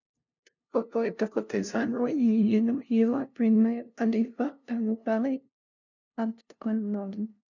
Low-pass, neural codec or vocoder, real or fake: 7.2 kHz; codec, 16 kHz, 0.5 kbps, FunCodec, trained on LibriTTS, 25 frames a second; fake